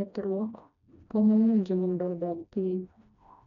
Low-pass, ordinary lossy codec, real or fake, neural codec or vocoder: 7.2 kHz; none; fake; codec, 16 kHz, 1 kbps, FreqCodec, smaller model